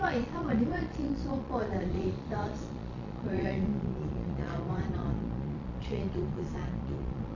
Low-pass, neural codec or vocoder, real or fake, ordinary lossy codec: 7.2 kHz; vocoder, 22.05 kHz, 80 mel bands, Vocos; fake; none